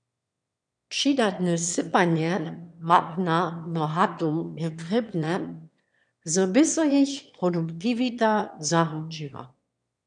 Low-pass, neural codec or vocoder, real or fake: 9.9 kHz; autoencoder, 22.05 kHz, a latent of 192 numbers a frame, VITS, trained on one speaker; fake